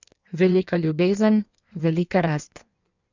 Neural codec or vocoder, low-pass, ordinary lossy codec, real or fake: codec, 16 kHz in and 24 kHz out, 1.1 kbps, FireRedTTS-2 codec; 7.2 kHz; none; fake